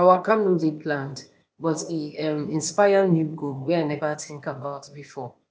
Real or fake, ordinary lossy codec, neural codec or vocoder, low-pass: fake; none; codec, 16 kHz, 0.8 kbps, ZipCodec; none